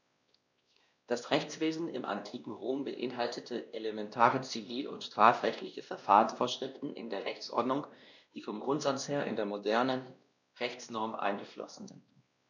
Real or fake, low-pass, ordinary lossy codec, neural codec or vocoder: fake; 7.2 kHz; none; codec, 16 kHz, 1 kbps, X-Codec, WavLM features, trained on Multilingual LibriSpeech